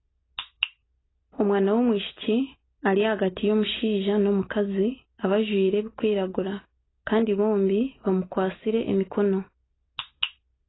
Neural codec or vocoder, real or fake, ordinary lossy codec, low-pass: none; real; AAC, 16 kbps; 7.2 kHz